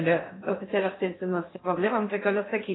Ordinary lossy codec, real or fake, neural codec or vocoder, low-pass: AAC, 16 kbps; fake; codec, 16 kHz in and 24 kHz out, 0.6 kbps, FocalCodec, streaming, 2048 codes; 7.2 kHz